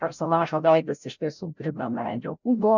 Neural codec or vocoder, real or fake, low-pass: codec, 16 kHz, 0.5 kbps, FreqCodec, larger model; fake; 7.2 kHz